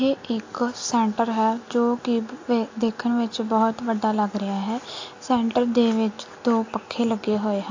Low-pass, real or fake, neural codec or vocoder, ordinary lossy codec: 7.2 kHz; real; none; AAC, 48 kbps